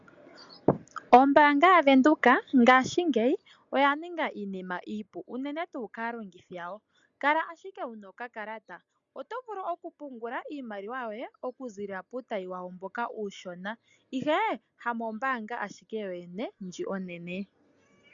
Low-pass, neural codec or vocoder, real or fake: 7.2 kHz; none; real